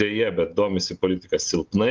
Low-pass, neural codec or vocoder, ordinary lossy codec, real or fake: 7.2 kHz; none; Opus, 32 kbps; real